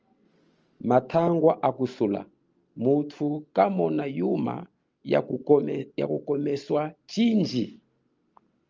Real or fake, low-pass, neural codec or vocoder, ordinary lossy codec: real; 7.2 kHz; none; Opus, 24 kbps